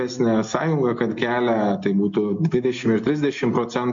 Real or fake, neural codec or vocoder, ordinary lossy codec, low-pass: real; none; MP3, 48 kbps; 7.2 kHz